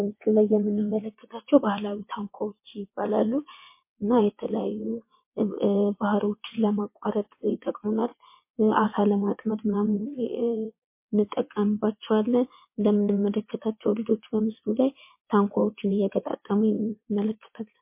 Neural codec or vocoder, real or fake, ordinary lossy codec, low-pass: vocoder, 22.05 kHz, 80 mel bands, WaveNeXt; fake; MP3, 24 kbps; 3.6 kHz